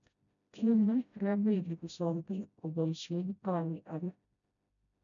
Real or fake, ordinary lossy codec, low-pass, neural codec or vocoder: fake; none; 7.2 kHz; codec, 16 kHz, 0.5 kbps, FreqCodec, smaller model